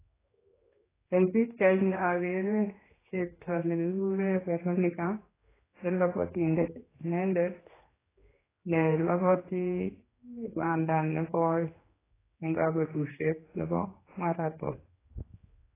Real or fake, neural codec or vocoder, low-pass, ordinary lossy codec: fake; codec, 16 kHz, 2 kbps, X-Codec, HuBERT features, trained on general audio; 3.6 kHz; AAC, 16 kbps